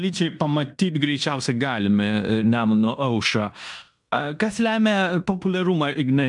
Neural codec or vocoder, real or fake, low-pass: codec, 16 kHz in and 24 kHz out, 0.9 kbps, LongCat-Audio-Codec, fine tuned four codebook decoder; fake; 10.8 kHz